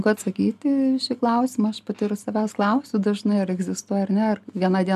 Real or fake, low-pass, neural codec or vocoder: real; 14.4 kHz; none